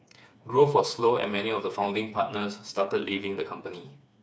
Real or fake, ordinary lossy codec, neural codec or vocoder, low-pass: fake; none; codec, 16 kHz, 4 kbps, FreqCodec, smaller model; none